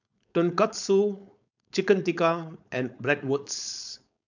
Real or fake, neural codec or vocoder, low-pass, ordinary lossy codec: fake; codec, 16 kHz, 4.8 kbps, FACodec; 7.2 kHz; none